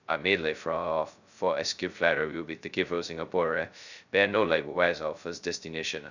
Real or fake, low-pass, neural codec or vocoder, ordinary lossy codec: fake; 7.2 kHz; codec, 16 kHz, 0.2 kbps, FocalCodec; none